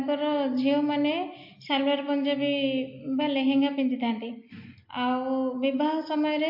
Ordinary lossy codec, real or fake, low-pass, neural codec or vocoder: MP3, 32 kbps; real; 5.4 kHz; none